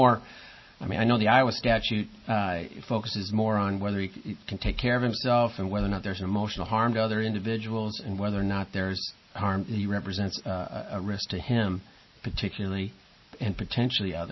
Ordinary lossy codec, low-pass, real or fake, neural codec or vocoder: MP3, 24 kbps; 7.2 kHz; real; none